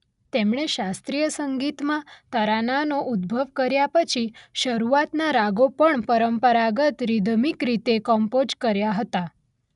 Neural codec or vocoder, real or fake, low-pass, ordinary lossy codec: none; real; 10.8 kHz; none